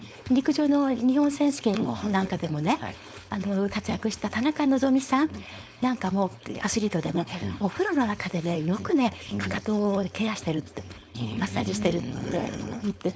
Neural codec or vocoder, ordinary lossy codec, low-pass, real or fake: codec, 16 kHz, 4.8 kbps, FACodec; none; none; fake